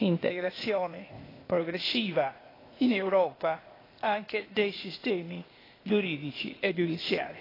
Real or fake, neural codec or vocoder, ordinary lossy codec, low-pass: fake; codec, 16 kHz, 0.8 kbps, ZipCodec; AAC, 24 kbps; 5.4 kHz